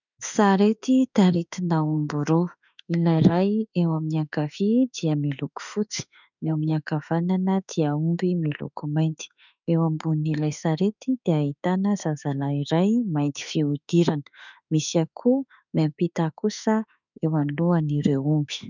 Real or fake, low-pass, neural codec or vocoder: fake; 7.2 kHz; autoencoder, 48 kHz, 32 numbers a frame, DAC-VAE, trained on Japanese speech